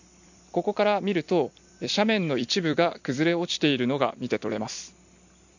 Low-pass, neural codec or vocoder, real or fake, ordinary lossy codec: 7.2 kHz; vocoder, 44.1 kHz, 128 mel bands every 256 samples, BigVGAN v2; fake; none